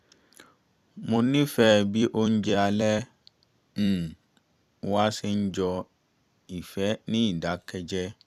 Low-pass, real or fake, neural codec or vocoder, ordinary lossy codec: 14.4 kHz; fake; vocoder, 44.1 kHz, 128 mel bands every 256 samples, BigVGAN v2; none